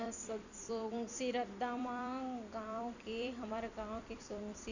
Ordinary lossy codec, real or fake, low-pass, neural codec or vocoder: none; fake; 7.2 kHz; vocoder, 44.1 kHz, 128 mel bands every 256 samples, BigVGAN v2